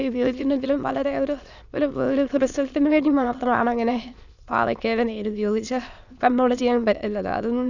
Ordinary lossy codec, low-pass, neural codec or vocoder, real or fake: none; 7.2 kHz; autoencoder, 22.05 kHz, a latent of 192 numbers a frame, VITS, trained on many speakers; fake